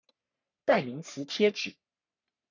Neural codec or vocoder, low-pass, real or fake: codec, 44.1 kHz, 3.4 kbps, Pupu-Codec; 7.2 kHz; fake